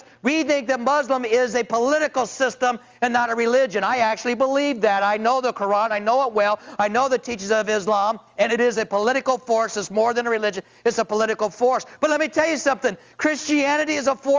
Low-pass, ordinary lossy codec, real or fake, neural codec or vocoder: 7.2 kHz; Opus, 32 kbps; real; none